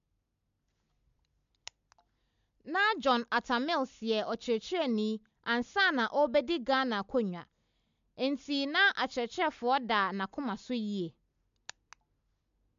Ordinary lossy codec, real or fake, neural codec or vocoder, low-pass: MP3, 64 kbps; real; none; 7.2 kHz